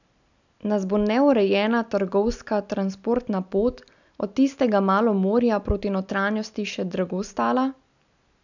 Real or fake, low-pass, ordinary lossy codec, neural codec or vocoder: real; 7.2 kHz; none; none